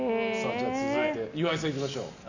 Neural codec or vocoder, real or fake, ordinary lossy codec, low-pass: none; real; none; 7.2 kHz